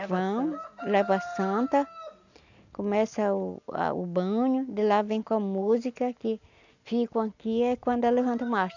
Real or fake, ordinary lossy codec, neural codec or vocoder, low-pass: real; none; none; 7.2 kHz